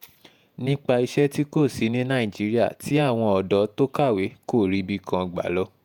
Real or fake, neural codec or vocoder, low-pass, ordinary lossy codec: fake; vocoder, 48 kHz, 128 mel bands, Vocos; 19.8 kHz; none